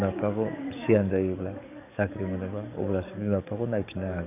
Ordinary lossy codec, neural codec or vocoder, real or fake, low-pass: none; codec, 44.1 kHz, 7.8 kbps, DAC; fake; 3.6 kHz